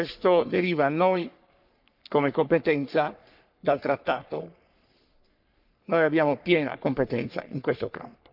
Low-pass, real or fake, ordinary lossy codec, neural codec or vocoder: 5.4 kHz; fake; none; codec, 44.1 kHz, 3.4 kbps, Pupu-Codec